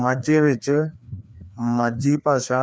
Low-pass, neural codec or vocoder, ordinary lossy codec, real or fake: none; codec, 16 kHz, 2 kbps, FreqCodec, larger model; none; fake